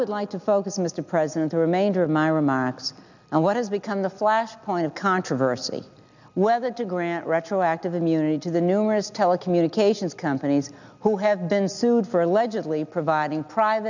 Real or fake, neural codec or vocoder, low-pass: real; none; 7.2 kHz